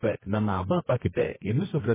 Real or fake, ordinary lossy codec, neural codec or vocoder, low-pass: fake; MP3, 16 kbps; codec, 24 kHz, 0.9 kbps, WavTokenizer, medium music audio release; 3.6 kHz